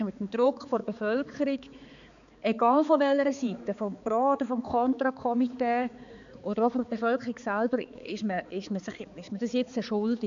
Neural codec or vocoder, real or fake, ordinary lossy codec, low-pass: codec, 16 kHz, 4 kbps, X-Codec, HuBERT features, trained on balanced general audio; fake; none; 7.2 kHz